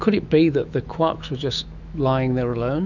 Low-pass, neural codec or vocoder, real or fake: 7.2 kHz; autoencoder, 48 kHz, 128 numbers a frame, DAC-VAE, trained on Japanese speech; fake